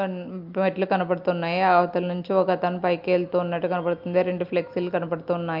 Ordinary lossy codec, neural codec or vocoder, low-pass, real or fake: Opus, 24 kbps; none; 5.4 kHz; real